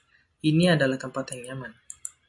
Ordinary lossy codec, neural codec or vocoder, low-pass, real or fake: AAC, 64 kbps; none; 10.8 kHz; real